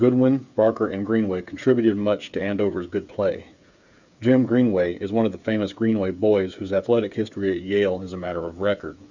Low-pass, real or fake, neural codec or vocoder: 7.2 kHz; fake; codec, 16 kHz, 8 kbps, FreqCodec, smaller model